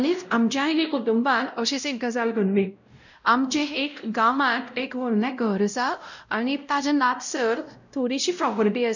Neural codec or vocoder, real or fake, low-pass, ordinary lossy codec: codec, 16 kHz, 0.5 kbps, X-Codec, WavLM features, trained on Multilingual LibriSpeech; fake; 7.2 kHz; none